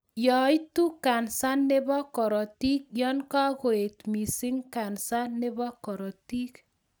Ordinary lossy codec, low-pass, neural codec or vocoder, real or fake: none; none; none; real